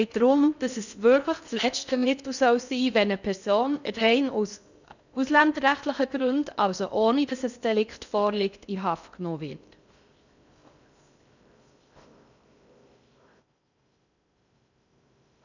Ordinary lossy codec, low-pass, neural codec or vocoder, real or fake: none; 7.2 kHz; codec, 16 kHz in and 24 kHz out, 0.6 kbps, FocalCodec, streaming, 2048 codes; fake